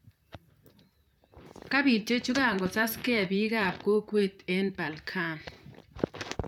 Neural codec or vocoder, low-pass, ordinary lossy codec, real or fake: vocoder, 44.1 kHz, 128 mel bands, Pupu-Vocoder; 19.8 kHz; none; fake